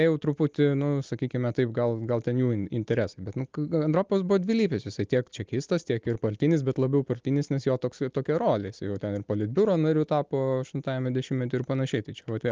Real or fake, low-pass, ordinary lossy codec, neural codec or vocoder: real; 7.2 kHz; Opus, 24 kbps; none